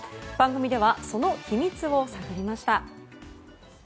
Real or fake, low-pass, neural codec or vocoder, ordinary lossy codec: real; none; none; none